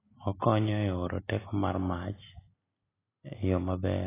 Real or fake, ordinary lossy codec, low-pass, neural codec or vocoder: real; AAC, 16 kbps; 3.6 kHz; none